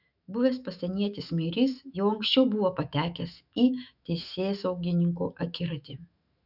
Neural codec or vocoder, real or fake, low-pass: autoencoder, 48 kHz, 128 numbers a frame, DAC-VAE, trained on Japanese speech; fake; 5.4 kHz